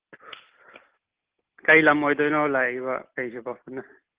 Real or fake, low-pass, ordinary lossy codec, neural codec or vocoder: real; 3.6 kHz; Opus, 16 kbps; none